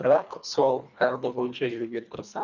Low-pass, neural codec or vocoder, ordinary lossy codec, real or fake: 7.2 kHz; codec, 24 kHz, 1.5 kbps, HILCodec; none; fake